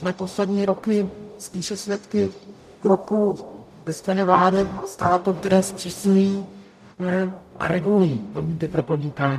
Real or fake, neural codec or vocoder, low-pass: fake; codec, 44.1 kHz, 0.9 kbps, DAC; 14.4 kHz